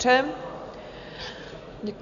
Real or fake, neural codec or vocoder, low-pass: real; none; 7.2 kHz